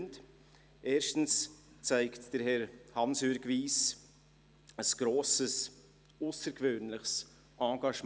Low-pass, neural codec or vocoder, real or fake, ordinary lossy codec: none; none; real; none